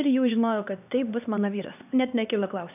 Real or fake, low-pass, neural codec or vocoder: fake; 3.6 kHz; codec, 16 kHz, 2 kbps, X-Codec, HuBERT features, trained on LibriSpeech